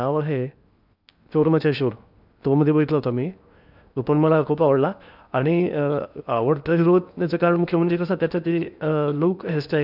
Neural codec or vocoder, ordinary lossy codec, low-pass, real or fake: codec, 16 kHz in and 24 kHz out, 0.8 kbps, FocalCodec, streaming, 65536 codes; none; 5.4 kHz; fake